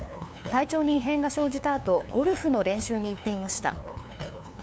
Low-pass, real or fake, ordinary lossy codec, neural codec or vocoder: none; fake; none; codec, 16 kHz, 2 kbps, FunCodec, trained on LibriTTS, 25 frames a second